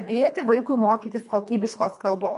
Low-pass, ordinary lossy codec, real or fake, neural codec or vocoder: 10.8 kHz; MP3, 64 kbps; fake; codec, 24 kHz, 1.5 kbps, HILCodec